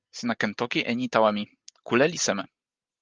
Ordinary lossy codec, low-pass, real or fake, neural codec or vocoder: Opus, 24 kbps; 7.2 kHz; real; none